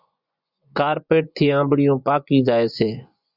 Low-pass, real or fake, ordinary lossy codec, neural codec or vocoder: 5.4 kHz; fake; Opus, 64 kbps; vocoder, 24 kHz, 100 mel bands, Vocos